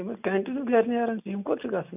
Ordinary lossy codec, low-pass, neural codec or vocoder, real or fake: none; 3.6 kHz; none; real